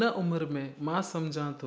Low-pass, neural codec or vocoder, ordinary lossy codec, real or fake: none; none; none; real